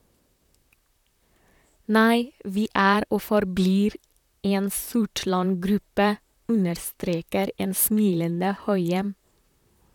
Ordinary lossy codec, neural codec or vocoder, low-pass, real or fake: none; vocoder, 44.1 kHz, 128 mel bands, Pupu-Vocoder; 19.8 kHz; fake